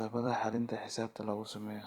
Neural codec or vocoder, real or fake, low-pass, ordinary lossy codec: vocoder, 44.1 kHz, 128 mel bands every 256 samples, BigVGAN v2; fake; 19.8 kHz; Opus, 64 kbps